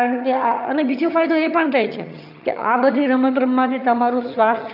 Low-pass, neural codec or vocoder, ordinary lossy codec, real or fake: 5.4 kHz; vocoder, 22.05 kHz, 80 mel bands, HiFi-GAN; none; fake